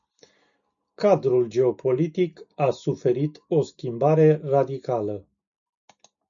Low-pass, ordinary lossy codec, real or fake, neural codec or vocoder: 7.2 kHz; MP3, 96 kbps; real; none